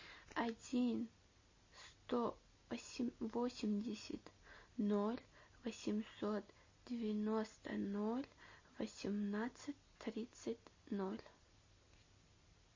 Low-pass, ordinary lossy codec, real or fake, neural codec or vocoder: 7.2 kHz; MP3, 32 kbps; real; none